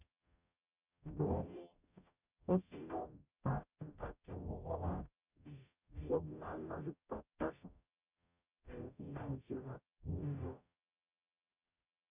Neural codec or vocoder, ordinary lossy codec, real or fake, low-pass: codec, 44.1 kHz, 0.9 kbps, DAC; none; fake; 3.6 kHz